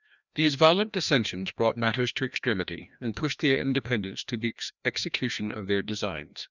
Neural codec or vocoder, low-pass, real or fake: codec, 16 kHz, 1 kbps, FreqCodec, larger model; 7.2 kHz; fake